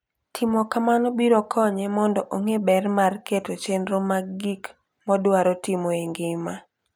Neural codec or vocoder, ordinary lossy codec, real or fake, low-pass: none; none; real; 19.8 kHz